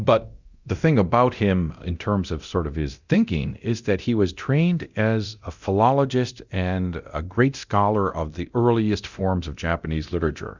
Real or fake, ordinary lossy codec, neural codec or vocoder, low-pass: fake; Opus, 64 kbps; codec, 24 kHz, 0.9 kbps, DualCodec; 7.2 kHz